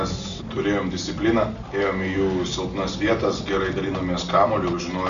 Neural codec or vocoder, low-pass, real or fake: none; 7.2 kHz; real